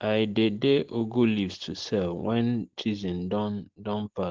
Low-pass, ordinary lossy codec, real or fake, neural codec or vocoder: 7.2 kHz; Opus, 16 kbps; real; none